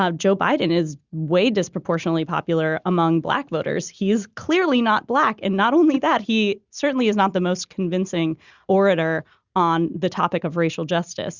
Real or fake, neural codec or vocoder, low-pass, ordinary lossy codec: real; none; 7.2 kHz; Opus, 64 kbps